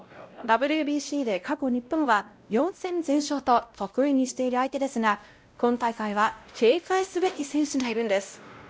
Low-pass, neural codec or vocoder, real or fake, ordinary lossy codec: none; codec, 16 kHz, 0.5 kbps, X-Codec, WavLM features, trained on Multilingual LibriSpeech; fake; none